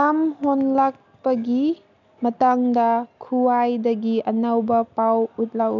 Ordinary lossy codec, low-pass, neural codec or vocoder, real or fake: none; 7.2 kHz; none; real